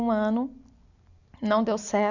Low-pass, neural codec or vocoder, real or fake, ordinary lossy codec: 7.2 kHz; none; real; none